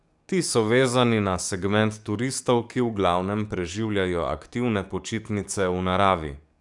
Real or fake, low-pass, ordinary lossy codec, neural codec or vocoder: fake; 10.8 kHz; none; codec, 44.1 kHz, 7.8 kbps, DAC